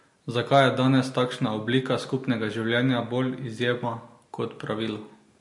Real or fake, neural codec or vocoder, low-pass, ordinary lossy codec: real; none; 10.8 kHz; MP3, 48 kbps